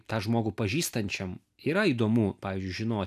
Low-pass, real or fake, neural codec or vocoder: 14.4 kHz; real; none